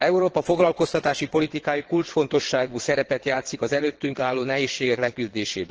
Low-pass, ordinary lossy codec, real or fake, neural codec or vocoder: 7.2 kHz; Opus, 16 kbps; fake; vocoder, 22.05 kHz, 80 mel bands, WaveNeXt